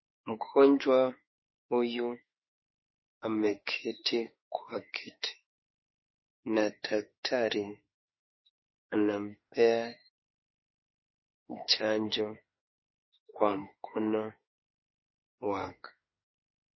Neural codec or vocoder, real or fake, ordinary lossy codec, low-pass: autoencoder, 48 kHz, 32 numbers a frame, DAC-VAE, trained on Japanese speech; fake; MP3, 24 kbps; 7.2 kHz